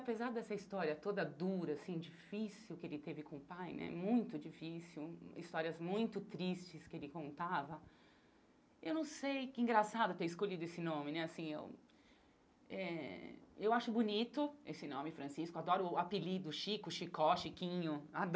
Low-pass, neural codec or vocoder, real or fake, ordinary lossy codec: none; none; real; none